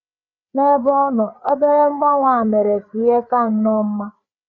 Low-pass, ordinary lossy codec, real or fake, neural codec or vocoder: 7.2 kHz; none; fake; codec, 16 kHz, 4 kbps, FreqCodec, larger model